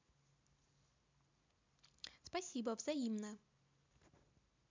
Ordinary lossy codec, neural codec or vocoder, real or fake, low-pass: MP3, 64 kbps; none; real; 7.2 kHz